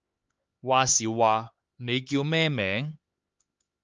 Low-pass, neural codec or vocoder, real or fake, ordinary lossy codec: 7.2 kHz; codec, 16 kHz, 4 kbps, X-Codec, HuBERT features, trained on LibriSpeech; fake; Opus, 24 kbps